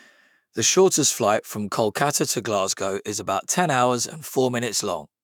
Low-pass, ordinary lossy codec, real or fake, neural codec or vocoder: none; none; fake; autoencoder, 48 kHz, 128 numbers a frame, DAC-VAE, trained on Japanese speech